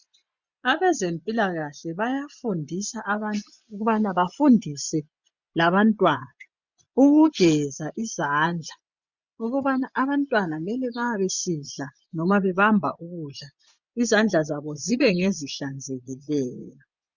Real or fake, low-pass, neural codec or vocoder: fake; 7.2 kHz; vocoder, 24 kHz, 100 mel bands, Vocos